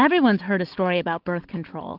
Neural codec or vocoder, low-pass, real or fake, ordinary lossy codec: none; 5.4 kHz; real; Opus, 32 kbps